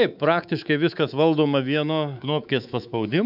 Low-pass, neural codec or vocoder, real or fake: 5.4 kHz; codec, 24 kHz, 3.1 kbps, DualCodec; fake